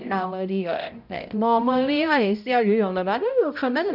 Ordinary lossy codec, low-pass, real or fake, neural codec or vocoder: none; 5.4 kHz; fake; codec, 16 kHz, 0.5 kbps, X-Codec, HuBERT features, trained on balanced general audio